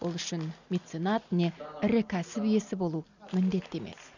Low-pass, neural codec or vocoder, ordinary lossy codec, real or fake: 7.2 kHz; none; none; real